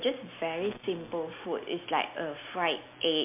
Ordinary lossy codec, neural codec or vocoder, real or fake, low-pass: MP3, 24 kbps; none; real; 3.6 kHz